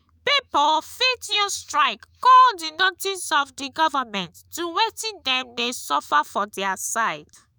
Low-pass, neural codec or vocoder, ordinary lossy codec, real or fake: none; autoencoder, 48 kHz, 128 numbers a frame, DAC-VAE, trained on Japanese speech; none; fake